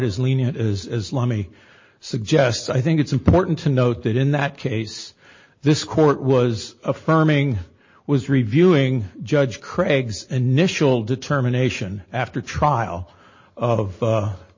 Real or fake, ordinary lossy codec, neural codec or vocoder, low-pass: real; MP3, 32 kbps; none; 7.2 kHz